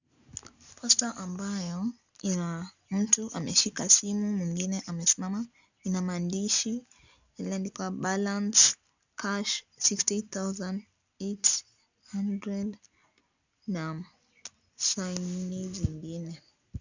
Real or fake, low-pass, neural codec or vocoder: real; 7.2 kHz; none